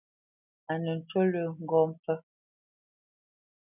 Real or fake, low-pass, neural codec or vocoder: real; 3.6 kHz; none